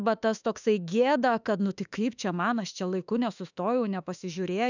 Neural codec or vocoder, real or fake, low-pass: autoencoder, 48 kHz, 32 numbers a frame, DAC-VAE, trained on Japanese speech; fake; 7.2 kHz